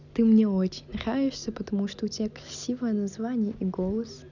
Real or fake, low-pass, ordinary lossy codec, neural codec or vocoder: real; 7.2 kHz; none; none